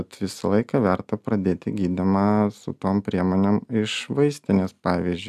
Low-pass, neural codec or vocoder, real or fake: 14.4 kHz; none; real